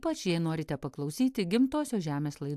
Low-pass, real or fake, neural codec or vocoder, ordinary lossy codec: 14.4 kHz; real; none; AAC, 96 kbps